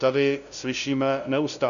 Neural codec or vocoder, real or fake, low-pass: codec, 16 kHz, 0.5 kbps, FunCodec, trained on LibriTTS, 25 frames a second; fake; 7.2 kHz